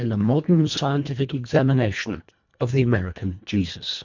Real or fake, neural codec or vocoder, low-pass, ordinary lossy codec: fake; codec, 24 kHz, 1.5 kbps, HILCodec; 7.2 kHz; MP3, 64 kbps